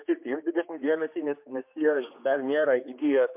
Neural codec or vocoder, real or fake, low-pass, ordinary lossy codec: codec, 16 kHz, 2 kbps, X-Codec, HuBERT features, trained on general audio; fake; 3.6 kHz; MP3, 32 kbps